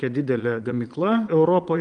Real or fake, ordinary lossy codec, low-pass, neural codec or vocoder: fake; Opus, 32 kbps; 9.9 kHz; vocoder, 22.05 kHz, 80 mel bands, Vocos